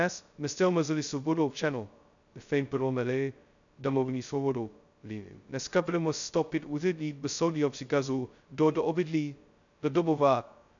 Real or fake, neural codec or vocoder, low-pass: fake; codec, 16 kHz, 0.2 kbps, FocalCodec; 7.2 kHz